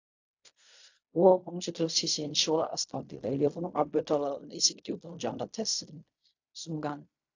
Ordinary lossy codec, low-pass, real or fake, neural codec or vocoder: AAC, 48 kbps; 7.2 kHz; fake; codec, 16 kHz in and 24 kHz out, 0.4 kbps, LongCat-Audio-Codec, fine tuned four codebook decoder